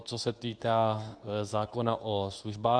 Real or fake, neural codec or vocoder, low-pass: fake; codec, 24 kHz, 0.9 kbps, WavTokenizer, medium speech release version 2; 9.9 kHz